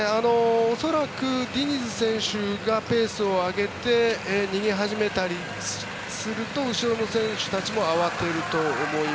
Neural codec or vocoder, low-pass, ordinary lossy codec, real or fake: none; none; none; real